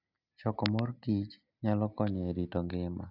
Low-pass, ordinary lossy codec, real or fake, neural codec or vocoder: 5.4 kHz; none; real; none